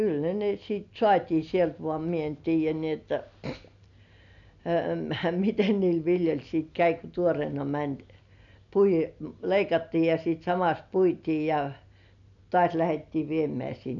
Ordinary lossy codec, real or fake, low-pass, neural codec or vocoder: none; real; 7.2 kHz; none